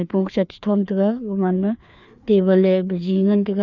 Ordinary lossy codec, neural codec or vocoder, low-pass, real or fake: none; codec, 16 kHz, 2 kbps, FreqCodec, larger model; 7.2 kHz; fake